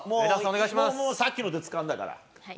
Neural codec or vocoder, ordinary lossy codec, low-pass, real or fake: none; none; none; real